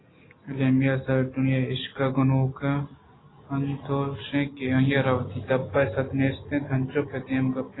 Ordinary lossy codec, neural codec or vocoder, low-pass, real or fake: AAC, 16 kbps; none; 7.2 kHz; real